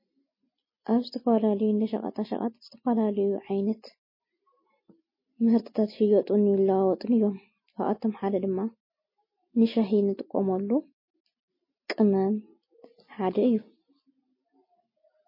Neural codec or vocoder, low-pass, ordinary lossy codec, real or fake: none; 5.4 kHz; MP3, 24 kbps; real